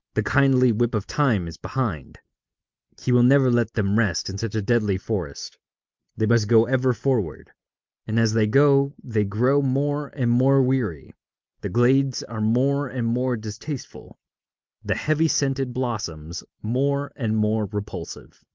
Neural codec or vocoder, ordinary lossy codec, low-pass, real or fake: none; Opus, 24 kbps; 7.2 kHz; real